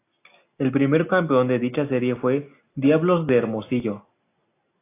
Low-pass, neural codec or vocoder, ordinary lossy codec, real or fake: 3.6 kHz; none; AAC, 24 kbps; real